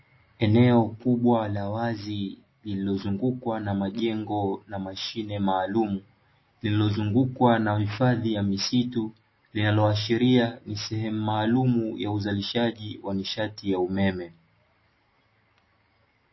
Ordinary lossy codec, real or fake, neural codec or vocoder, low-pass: MP3, 24 kbps; real; none; 7.2 kHz